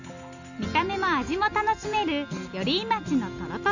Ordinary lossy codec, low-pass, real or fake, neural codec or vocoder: none; 7.2 kHz; real; none